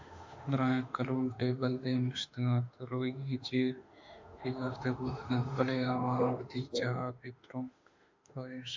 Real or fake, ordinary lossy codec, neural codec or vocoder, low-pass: fake; MP3, 64 kbps; autoencoder, 48 kHz, 32 numbers a frame, DAC-VAE, trained on Japanese speech; 7.2 kHz